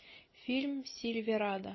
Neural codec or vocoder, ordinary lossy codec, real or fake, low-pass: none; MP3, 24 kbps; real; 7.2 kHz